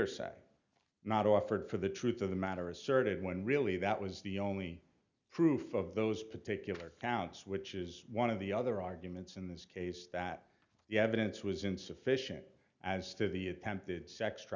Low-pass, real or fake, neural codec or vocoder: 7.2 kHz; real; none